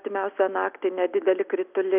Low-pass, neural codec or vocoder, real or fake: 3.6 kHz; none; real